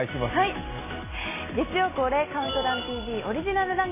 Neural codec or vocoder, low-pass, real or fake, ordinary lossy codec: none; 3.6 kHz; real; MP3, 16 kbps